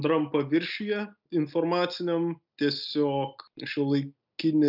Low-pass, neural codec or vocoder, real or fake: 5.4 kHz; none; real